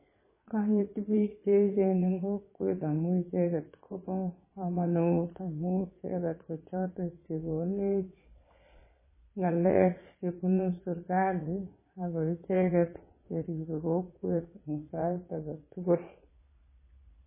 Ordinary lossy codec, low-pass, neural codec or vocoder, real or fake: MP3, 16 kbps; 3.6 kHz; vocoder, 22.05 kHz, 80 mel bands, Vocos; fake